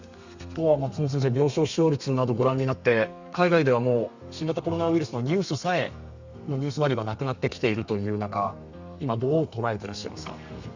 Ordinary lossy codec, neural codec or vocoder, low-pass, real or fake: Opus, 64 kbps; codec, 32 kHz, 1.9 kbps, SNAC; 7.2 kHz; fake